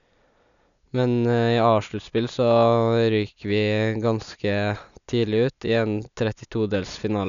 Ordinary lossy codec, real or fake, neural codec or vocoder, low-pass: none; real; none; 7.2 kHz